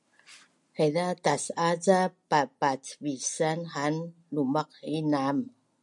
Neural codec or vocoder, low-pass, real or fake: none; 10.8 kHz; real